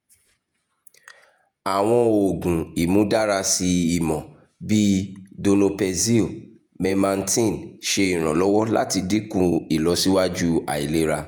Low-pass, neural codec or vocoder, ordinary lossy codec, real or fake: none; vocoder, 48 kHz, 128 mel bands, Vocos; none; fake